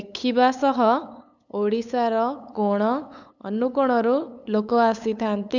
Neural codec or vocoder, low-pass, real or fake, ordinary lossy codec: codec, 16 kHz, 8 kbps, FunCodec, trained on LibriTTS, 25 frames a second; 7.2 kHz; fake; none